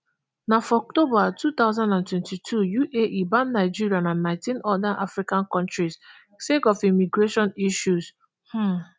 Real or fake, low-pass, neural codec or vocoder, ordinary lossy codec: real; none; none; none